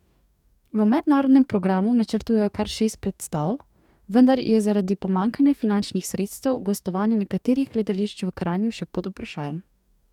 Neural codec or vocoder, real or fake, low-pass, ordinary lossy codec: codec, 44.1 kHz, 2.6 kbps, DAC; fake; 19.8 kHz; none